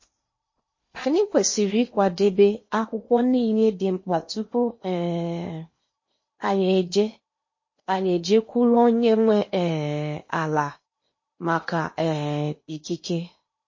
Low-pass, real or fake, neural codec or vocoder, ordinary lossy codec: 7.2 kHz; fake; codec, 16 kHz in and 24 kHz out, 0.8 kbps, FocalCodec, streaming, 65536 codes; MP3, 32 kbps